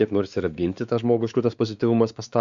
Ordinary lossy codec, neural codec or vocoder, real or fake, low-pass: Opus, 64 kbps; codec, 16 kHz, 4 kbps, X-Codec, WavLM features, trained on Multilingual LibriSpeech; fake; 7.2 kHz